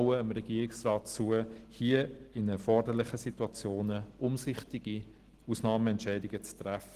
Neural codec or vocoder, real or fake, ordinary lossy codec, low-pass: none; real; Opus, 16 kbps; 14.4 kHz